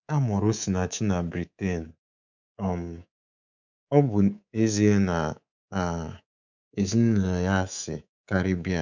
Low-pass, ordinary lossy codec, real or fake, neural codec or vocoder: 7.2 kHz; none; fake; codec, 24 kHz, 3.1 kbps, DualCodec